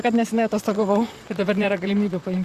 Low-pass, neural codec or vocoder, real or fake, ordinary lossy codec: 14.4 kHz; vocoder, 44.1 kHz, 128 mel bands, Pupu-Vocoder; fake; Opus, 64 kbps